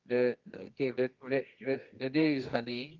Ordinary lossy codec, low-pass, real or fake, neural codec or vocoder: Opus, 32 kbps; 7.2 kHz; fake; codec, 24 kHz, 0.9 kbps, WavTokenizer, medium music audio release